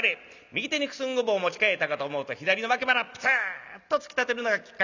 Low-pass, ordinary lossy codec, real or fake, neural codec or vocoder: 7.2 kHz; none; real; none